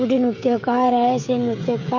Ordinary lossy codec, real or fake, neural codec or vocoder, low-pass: MP3, 48 kbps; fake; codec, 16 kHz, 16 kbps, FreqCodec, smaller model; 7.2 kHz